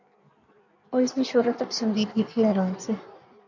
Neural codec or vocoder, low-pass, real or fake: codec, 16 kHz in and 24 kHz out, 1.1 kbps, FireRedTTS-2 codec; 7.2 kHz; fake